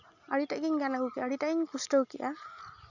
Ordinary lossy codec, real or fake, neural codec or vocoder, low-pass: none; real; none; 7.2 kHz